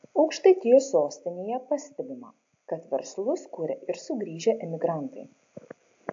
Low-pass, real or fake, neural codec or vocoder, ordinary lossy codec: 7.2 kHz; real; none; AAC, 48 kbps